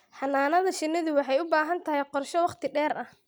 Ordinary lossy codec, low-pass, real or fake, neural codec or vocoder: none; none; real; none